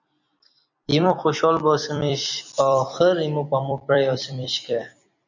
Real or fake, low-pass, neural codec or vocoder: fake; 7.2 kHz; vocoder, 44.1 kHz, 128 mel bands every 256 samples, BigVGAN v2